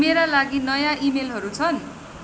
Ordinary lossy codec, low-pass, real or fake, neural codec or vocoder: none; none; real; none